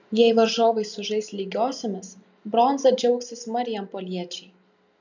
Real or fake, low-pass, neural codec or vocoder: real; 7.2 kHz; none